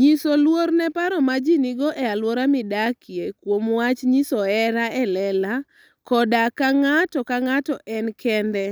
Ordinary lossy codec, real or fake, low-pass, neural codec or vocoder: none; real; none; none